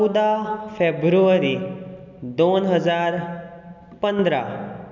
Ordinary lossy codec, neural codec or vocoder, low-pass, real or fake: none; none; 7.2 kHz; real